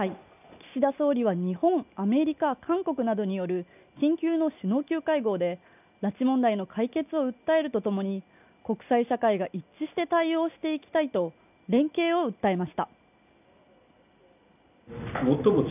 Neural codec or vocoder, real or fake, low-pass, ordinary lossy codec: none; real; 3.6 kHz; none